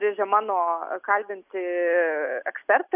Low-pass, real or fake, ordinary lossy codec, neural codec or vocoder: 3.6 kHz; real; AAC, 32 kbps; none